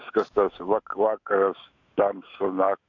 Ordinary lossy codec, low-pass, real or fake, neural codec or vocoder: MP3, 48 kbps; 7.2 kHz; fake; autoencoder, 48 kHz, 128 numbers a frame, DAC-VAE, trained on Japanese speech